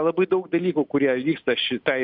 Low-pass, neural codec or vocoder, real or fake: 5.4 kHz; none; real